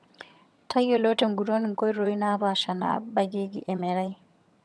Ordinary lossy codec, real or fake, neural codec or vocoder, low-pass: none; fake; vocoder, 22.05 kHz, 80 mel bands, HiFi-GAN; none